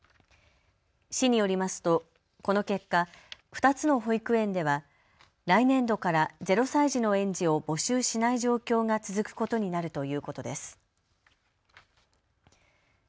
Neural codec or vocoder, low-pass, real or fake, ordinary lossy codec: none; none; real; none